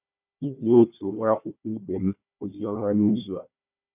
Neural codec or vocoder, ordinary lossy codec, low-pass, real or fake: codec, 16 kHz, 1 kbps, FunCodec, trained on Chinese and English, 50 frames a second; none; 3.6 kHz; fake